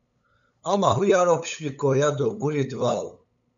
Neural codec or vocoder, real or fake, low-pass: codec, 16 kHz, 8 kbps, FunCodec, trained on LibriTTS, 25 frames a second; fake; 7.2 kHz